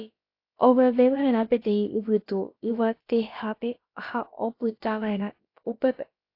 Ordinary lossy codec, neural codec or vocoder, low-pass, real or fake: AAC, 32 kbps; codec, 16 kHz, about 1 kbps, DyCAST, with the encoder's durations; 5.4 kHz; fake